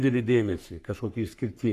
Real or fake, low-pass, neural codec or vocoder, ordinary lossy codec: fake; 14.4 kHz; codec, 44.1 kHz, 3.4 kbps, Pupu-Codec; AAC, 96 kbps